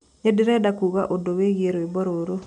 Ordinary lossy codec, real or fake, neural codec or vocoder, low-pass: none; real; none; 10.8 kHz